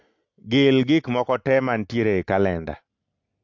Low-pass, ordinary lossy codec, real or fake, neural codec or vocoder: 7.2 kHz; AAC, 48 kbps; real; none